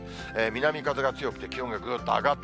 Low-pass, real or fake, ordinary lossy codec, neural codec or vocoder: none; real; none; none